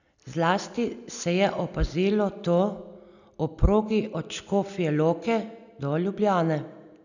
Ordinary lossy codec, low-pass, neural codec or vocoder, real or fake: none; 7.2 kHz; none; real